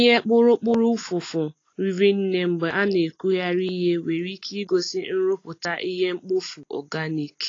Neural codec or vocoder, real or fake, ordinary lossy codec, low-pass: none; real; AAC, 32 kbps; 7.2 kHz